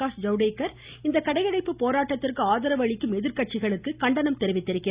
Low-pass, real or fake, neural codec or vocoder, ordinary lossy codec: 3.6 kHz; real; none; Opus, 64 kbps